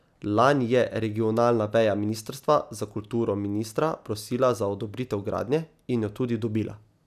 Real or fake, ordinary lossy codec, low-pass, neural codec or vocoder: real; none; 14.4 kHz; none